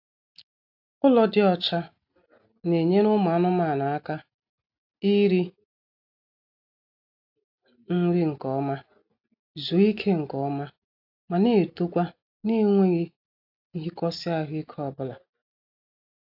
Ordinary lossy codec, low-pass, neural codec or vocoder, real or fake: AAC, 48 kbps; 5.4 kHz; none; real